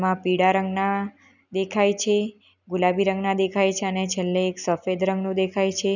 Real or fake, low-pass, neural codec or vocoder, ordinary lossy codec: real; 7.2 kHz; none; none